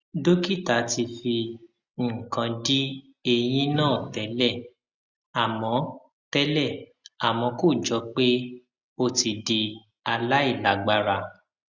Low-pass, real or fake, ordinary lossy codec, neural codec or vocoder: 7.2 kHz; real; Opus, 64 kbps; none